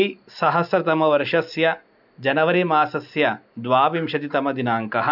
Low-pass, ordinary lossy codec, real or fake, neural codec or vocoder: 5.4 kHz; none; real; none